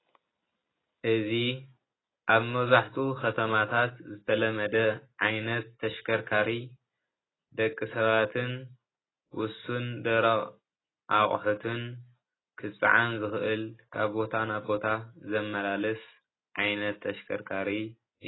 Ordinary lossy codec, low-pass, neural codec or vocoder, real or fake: AAC, 16 kbps; 7.2 kHz; none; real